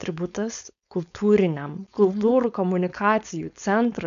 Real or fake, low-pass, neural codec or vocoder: fake; 7.2 kHz; codec, 16 kHz, 4.8 kbps, FACodec